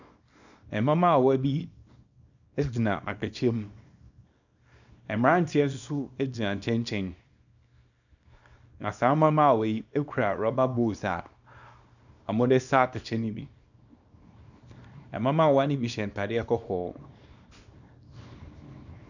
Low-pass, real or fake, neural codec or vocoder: 7.2 kHz; fake; codec, 24 kHz, 0.9 kbps, WavTokenizer, small release